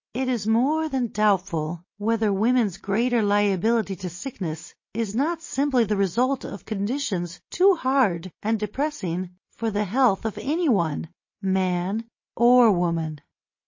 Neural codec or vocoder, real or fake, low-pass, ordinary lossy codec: none; real; 7.2 kHz; MP3, 32 kbps